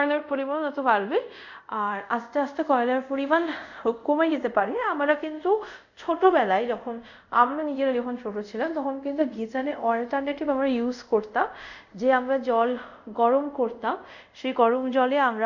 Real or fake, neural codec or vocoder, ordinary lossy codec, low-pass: fake; codec, 24 kHz, 0.5 kbps, DualCodec; none; 7.2 kHz